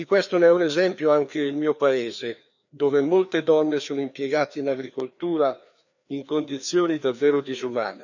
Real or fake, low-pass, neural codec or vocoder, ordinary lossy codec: fake; 7.2 kHz; codec, 16 kHz, 2 kbps, FreqCodec, larger model; none